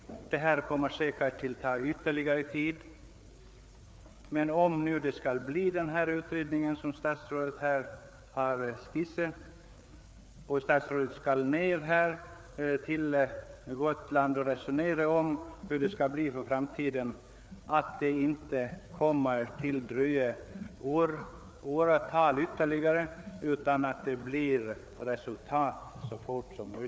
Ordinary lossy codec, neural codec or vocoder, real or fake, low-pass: none; codec, 16 kHz, 4 kbps, FreqCodec, larger model; fake; none